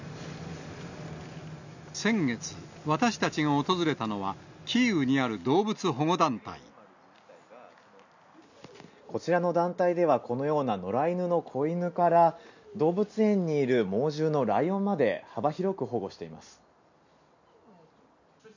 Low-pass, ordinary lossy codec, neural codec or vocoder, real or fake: 7.2 kHz; none; none; real